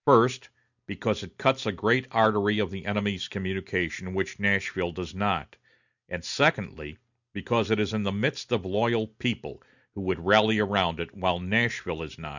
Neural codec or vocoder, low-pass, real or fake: none; 7.2 kHz; real